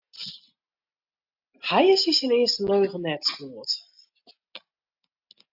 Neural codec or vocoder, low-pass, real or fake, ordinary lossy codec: none; 5.4 kHz; real; AAC, 48 kbps